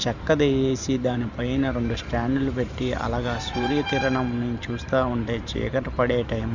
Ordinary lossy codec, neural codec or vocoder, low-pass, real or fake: none; vocoder, 44.1 kHz, 128 mel bands every 512 samples, BigVGAN v2; 7.2 kHz; fake